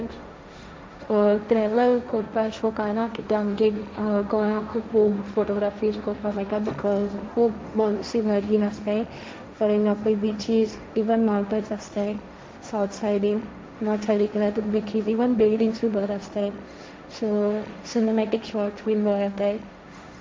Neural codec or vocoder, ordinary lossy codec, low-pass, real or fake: codec, 16 kHz, 1.1 kbps, Voila-Tokenizer; none; none; fake